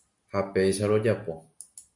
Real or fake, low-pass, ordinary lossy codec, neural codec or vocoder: real; 10.8 kHz; MP3, 96 kbps; none